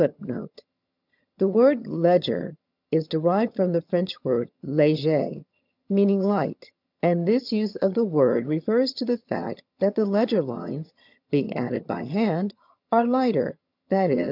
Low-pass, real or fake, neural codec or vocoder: 5.4 kHz; fake; vocoder, 22.05 kHz, 80 mel bands, HiFi-GAN